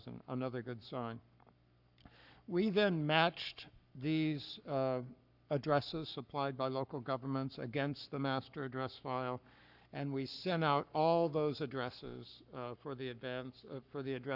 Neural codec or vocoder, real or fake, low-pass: codec, 44.1 kHz, 7.8 kbps, Pupu-Codec; fake; 5.4 kHz